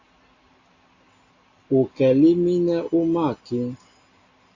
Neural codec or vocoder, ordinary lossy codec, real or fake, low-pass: none; AAC, 32 kbps; real; 7.2 kHz